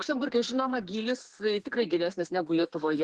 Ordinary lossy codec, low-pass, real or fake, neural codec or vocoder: Opus, 16 kbps; 10.8 kHz; fake; codec, 32 kHz, 1.9 kbps, SNAC